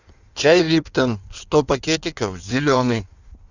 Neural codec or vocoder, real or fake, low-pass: codec, 16 kHz in and 24 kHz out, 1.1 kbps, FireRedTTS-2 codec; fake; 7.2 kHz